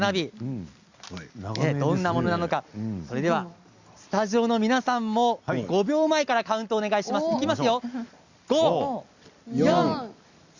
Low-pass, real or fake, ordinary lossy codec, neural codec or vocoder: 7.2 kHz; real; Opus, 64 kbps; none